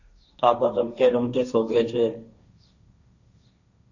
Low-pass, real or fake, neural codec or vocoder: 7.2 kHz; fake; codec, 16 kHz, 1.1 kbps, Voila-Tokenizer